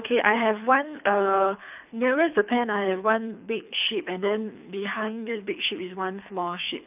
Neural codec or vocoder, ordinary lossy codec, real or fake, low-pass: codec, 24 kHz, 3 kbps, HILCodec; none; fake; 3.6 kHz